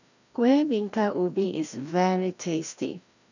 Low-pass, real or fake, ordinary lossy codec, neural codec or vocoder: 7.2 kHz; fake; none; codec, 16 kHz, 1 kbps, FreqCodec, larger model